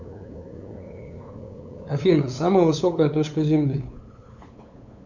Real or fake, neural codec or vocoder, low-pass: fake; codec, 16 kHz, 8 kbps, FunCodec, trained on LibriTTS, 25 frames a second; 7.2 kHz